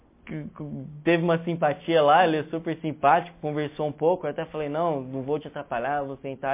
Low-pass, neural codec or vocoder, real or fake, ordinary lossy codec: 3.6 kHz; none; real; MP3, 24 kbps